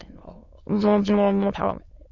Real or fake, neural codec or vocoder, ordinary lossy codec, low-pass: fake; autoencoder, 22.05 kHz, a latent of 192 numbers a frame, VITS, trained on many speakers; Opus, 64 kbps; 7.2 kHz